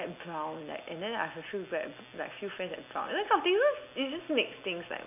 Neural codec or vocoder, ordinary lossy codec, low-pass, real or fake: none; none; 3.6 kHz; real